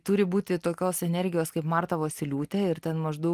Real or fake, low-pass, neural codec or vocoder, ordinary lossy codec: real; 14.4 kHz; none; Opus, 24 kbps